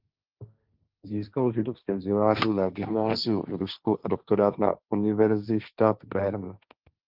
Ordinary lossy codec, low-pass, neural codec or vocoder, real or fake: Opus, 32 kbps; 5.4 kHz; codec, 16 kHz, 1.1 kbps, Voila-Tokenizer; fake